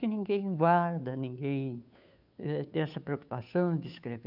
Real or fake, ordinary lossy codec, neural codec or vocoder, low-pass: fake; none; codec, 16 kHz, 2 kbps, FunCodec, trained on Chinese and English, 25 frames a second; 5.4 kHz